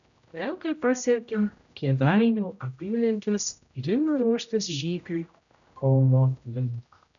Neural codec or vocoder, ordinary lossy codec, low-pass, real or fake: codec, 16 kHz, 0.5 kbps, X-Codec, HuBERT features, trained on general audio; MP3, 96 kbps; 7.2 kHz; fake